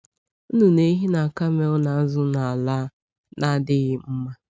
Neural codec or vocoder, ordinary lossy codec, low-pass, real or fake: none; none; none; real